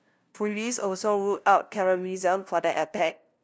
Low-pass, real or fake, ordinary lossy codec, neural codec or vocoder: none; fake; none; codec, 16 kHz, 0.5 kbps, FunCodec, trained on LibriTTS, 25 frames a second